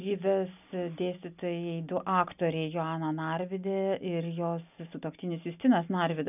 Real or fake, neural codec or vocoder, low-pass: real; none; 3.6 kHz